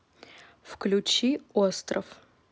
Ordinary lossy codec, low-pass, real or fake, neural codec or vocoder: none; none; real; none